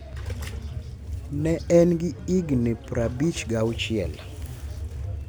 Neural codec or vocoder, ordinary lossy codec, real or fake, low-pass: none; none; real; none